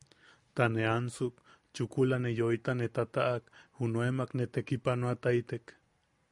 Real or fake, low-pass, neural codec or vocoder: real; 10.8 kHz; none